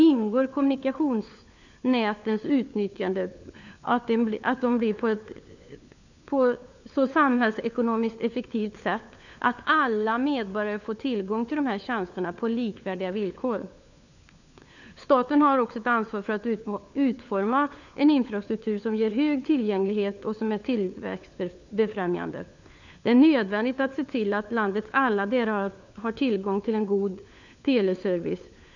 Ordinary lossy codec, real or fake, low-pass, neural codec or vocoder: none; fake; 7.2 kHz; codec, 16 kHz, 4 kbps, FunCodec, trained on LibriTTS, 50 frames a second